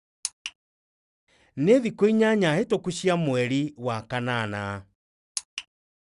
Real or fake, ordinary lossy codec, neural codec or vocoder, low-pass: real; Opus, 64 kbps; none; 10.8 kHz